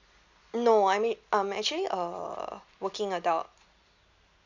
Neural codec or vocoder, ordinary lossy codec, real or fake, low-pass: none; none; real; 7.2 kHz